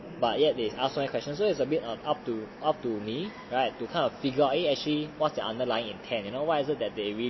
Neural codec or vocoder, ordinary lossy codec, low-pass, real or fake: none; MP3, 24 kbps; 7.2 kHz; real